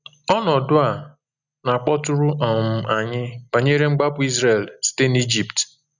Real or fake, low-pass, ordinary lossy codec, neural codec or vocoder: real; 7.2 kHz; none; none